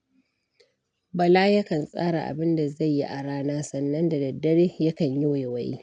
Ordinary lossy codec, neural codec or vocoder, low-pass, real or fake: none; none; 9.9 kHz; real